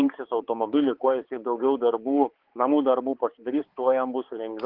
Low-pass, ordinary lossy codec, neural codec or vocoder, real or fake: 5.4 kHz; Opus, 16 kbps; codec, 16 kHz, 4 kbps, X-Codec, HuBERT features, trained on balanced general audio; fake